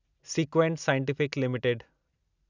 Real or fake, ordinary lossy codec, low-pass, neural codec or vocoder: real; none; 7.2 kHz; none